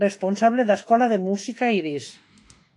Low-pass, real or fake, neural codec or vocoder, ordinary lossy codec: 10.8 kHz; fake; autoencoder, 48 kHz, 32 numbers a frame, DAC-VAE, trained on Japanese speech; AAC, 48 kbps